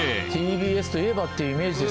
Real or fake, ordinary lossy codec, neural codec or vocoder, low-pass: real; none; none; none